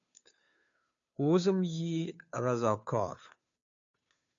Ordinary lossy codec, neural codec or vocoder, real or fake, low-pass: MP3, 64 kbps; codec, 16 kHz, 2 kbps, FunCodec, trained on Chinese and English, 25 frames a second; fake; 7.2 kHz